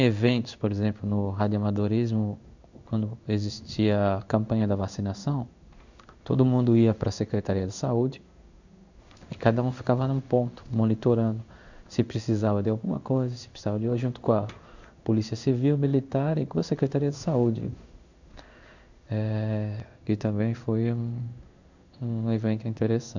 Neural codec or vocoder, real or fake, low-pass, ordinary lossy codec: codec, 16 kHz in and 24 kHz out, 1 kbps, XY-Tokenizer; fake; 7.2 kHz; none